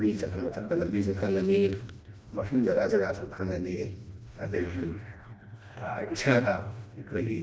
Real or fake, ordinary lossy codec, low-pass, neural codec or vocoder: fake; none; none; codec, 16 kHz, 1 kbps, FreqCodec, smaller model